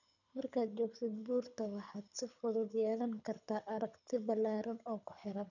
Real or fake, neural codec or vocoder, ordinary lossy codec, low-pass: fake; codec, 24 kHz, 6 kbps, HILCodec; none; 7.2 kHz